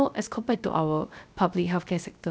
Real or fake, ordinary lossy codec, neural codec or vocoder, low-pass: fake; none; codec, 16 kHz, 0.3 kbps, FocalCodec; none